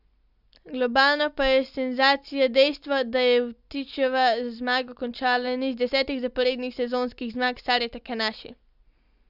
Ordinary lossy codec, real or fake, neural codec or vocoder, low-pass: none; real; none; 5.4 kHz